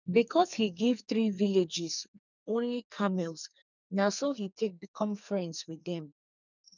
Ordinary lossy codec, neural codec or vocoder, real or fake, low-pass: none; codec, 44.1 kHz, 2.6 kbps, SNAC; fake; 7.2 kHz